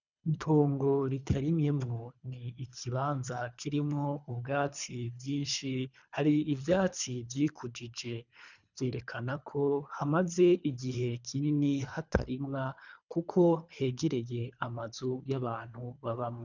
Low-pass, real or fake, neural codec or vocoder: 7.2 kHz; fake; codec, 24 kHz, 3 kbps, HILCodec